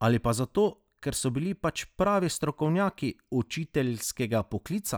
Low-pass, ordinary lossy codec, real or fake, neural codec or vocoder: none; none; real; none